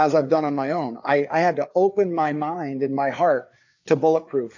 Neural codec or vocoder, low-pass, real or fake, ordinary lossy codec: codec, 16 kHz, 4 kbps, FreqCodec, larger model; 7.2 kHz; fake; AAC, 48 kbps